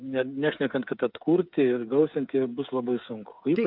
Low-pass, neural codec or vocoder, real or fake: 5.4 kHz; codec, 16 kHz, 8 kbps, FreqCodec, smaller model; fake